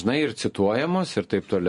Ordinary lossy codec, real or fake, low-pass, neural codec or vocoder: MP3, 48 kbps; real; 10.8 kHz; none